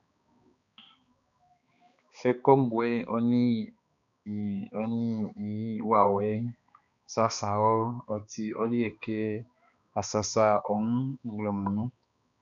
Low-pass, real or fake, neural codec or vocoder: 7.2 kHz; fake; codec, 16 kHz, 2 kbps, X-Codec, HuBERT features, trained on balanced general audio